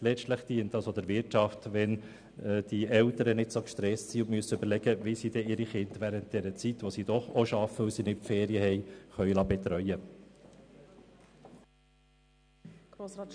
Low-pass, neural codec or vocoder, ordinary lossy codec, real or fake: 9.9 kHz; none; none; real